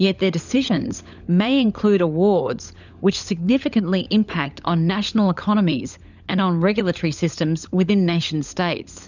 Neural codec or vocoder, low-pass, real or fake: codec, 16 kHz, 16 kbps, FunCodec, trained on LibriTTS, 50 frames a second; 7.2 kHz; fake